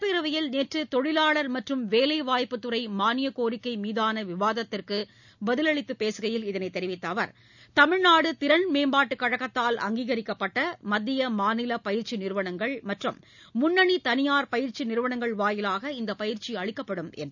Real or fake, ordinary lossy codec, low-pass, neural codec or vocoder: real; none; 7.2 kHz; none